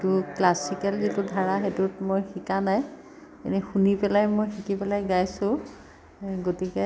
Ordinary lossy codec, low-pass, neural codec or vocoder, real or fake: none; none; none; real